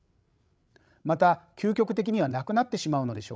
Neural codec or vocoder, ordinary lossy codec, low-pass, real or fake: codec, 16 kHz, 16 kbps, FreqCodec, larger model; none; none; fake